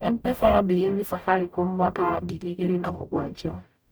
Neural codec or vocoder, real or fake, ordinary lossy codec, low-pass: codec, 44.1 kHz, 0.9 kbps, DAC; fake; none; none